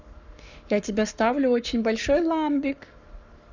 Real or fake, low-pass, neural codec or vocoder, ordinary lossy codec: fake; 7.2 kHz; codec, 44.1 kHz, 7.8 kbps, Pupu-Codec; none